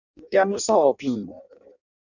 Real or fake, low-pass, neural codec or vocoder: fake; 7.2 kHz; codec, 16 kHz in and 24 kHz out, 0.6 kbps, FireRedTTS-2 codec